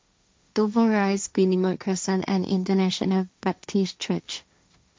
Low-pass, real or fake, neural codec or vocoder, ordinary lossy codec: none; fake; codec, 16 kHz, 1.1 kbps, Voila-Tokenizer; none